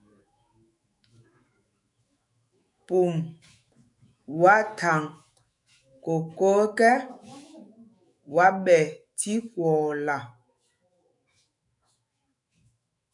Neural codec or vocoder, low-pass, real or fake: autoencoder, 48 kHz, 128 numbers a frame, DAC-VAE, trained on Japanese speech; 10.8 kHz; fake